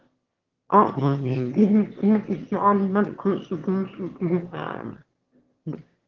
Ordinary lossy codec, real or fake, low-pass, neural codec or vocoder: Opus, 16 kbps; fake; 7.2 kHz; autoencoder, 22.05 kHz, a latent of 192 numbers a frame, VITS, trained on one speaker